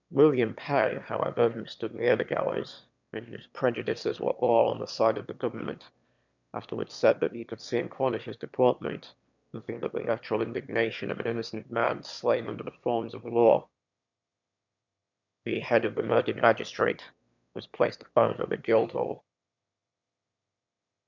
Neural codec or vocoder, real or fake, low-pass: autoencoder, 22.05 kHz, a latent of 192 numbers a frame, VITS, trained on one speaker; fake; 7.2 kHz